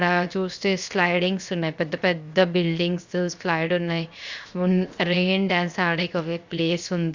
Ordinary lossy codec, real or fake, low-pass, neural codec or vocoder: Opus, 64 kbps; fake; 7.2 kHz; codec, 16 kHz, 0.7 kbps, FocalCodec